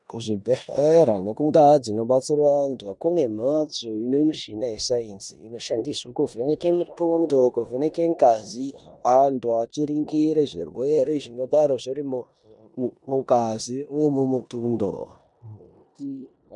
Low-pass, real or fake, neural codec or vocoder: 10.8 kHz; fake; codec, 16 kHz in and 24 kHz out, 0.9 kbps, LongCat-Audio-Codec, four codebook decoder